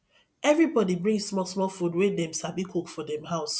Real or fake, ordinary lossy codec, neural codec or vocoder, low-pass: real; none; none; none